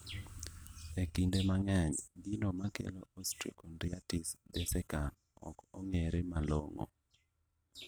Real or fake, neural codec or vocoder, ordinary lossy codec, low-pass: real; none; none; none